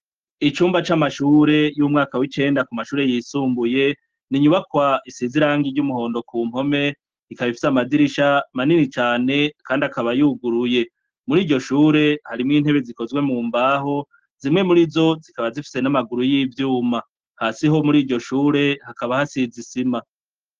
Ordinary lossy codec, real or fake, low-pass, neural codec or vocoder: Opus, 16 kbps; real; 7.2 kHz; none